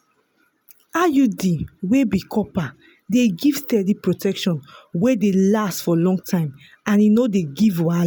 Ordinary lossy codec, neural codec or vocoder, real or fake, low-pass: none; none; real; none